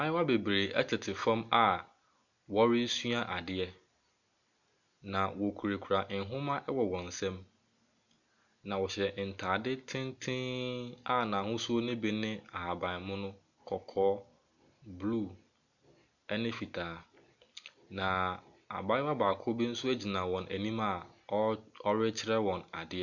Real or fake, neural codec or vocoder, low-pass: real; none; 7.2 kHz